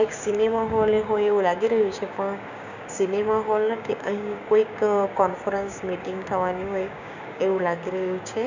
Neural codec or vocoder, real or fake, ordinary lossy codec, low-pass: codec, 16 kHz, 6 kbps, DAC; fake; none; 7.2 kHz